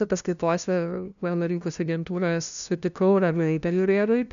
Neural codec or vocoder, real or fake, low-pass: codec, 16 kHz, 0.5 kbps, FunCodec, trained on LibriTTS, 25 frames a second; fake; 7.2 kHz